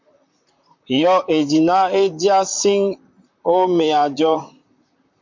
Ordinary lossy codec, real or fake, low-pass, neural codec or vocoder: MP3, 48 kbps; fake; 7.2 kHz; vocoder, 44.1 kHz, 128 mel bands, Pupu-Vocoder